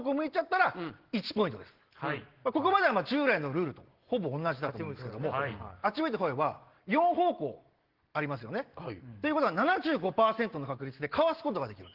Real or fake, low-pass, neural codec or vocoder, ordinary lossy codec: real; 5.4 kHz; none; Opus, 16 kbps